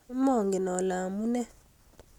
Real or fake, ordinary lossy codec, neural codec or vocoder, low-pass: real; none; none; 19.8 kHz